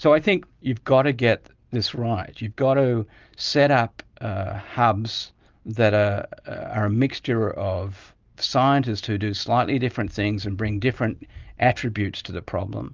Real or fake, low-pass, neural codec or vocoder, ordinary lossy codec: real; 7.2 kHz; none; Opus, 24 kbps